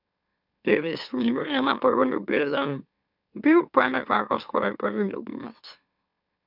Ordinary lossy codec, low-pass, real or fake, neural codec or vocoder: none; 5.4 kHz; fake; autoencoder, 44.1 kHz, a latent of 192 numbers a frame, MeloTTS